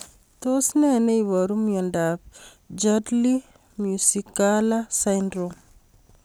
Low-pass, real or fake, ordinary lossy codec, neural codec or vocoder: none; real; none; none